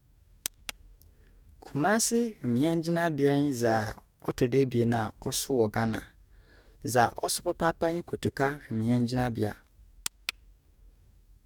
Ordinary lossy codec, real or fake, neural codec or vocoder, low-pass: none; fake; codec, 44.1 kHz, 2.6 kbps, DAC; 19.8 kHz